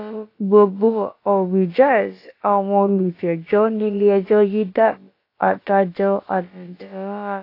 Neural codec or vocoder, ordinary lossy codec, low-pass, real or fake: codec, 16 kHz, about 1 kbps, DyCAST, with the encoder's durations; AAC, 32 kbps; 5.4 kHz; fake